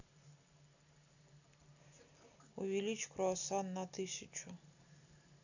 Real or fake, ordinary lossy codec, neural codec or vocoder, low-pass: real; none; none; 7.2 kHz